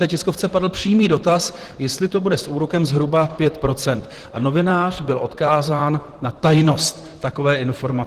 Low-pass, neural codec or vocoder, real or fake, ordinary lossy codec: 14.4 kHz; vocoder, 44.1 kHz, 128 mel bands, Pupu-Vocoder; fake; Opus, 16 kbps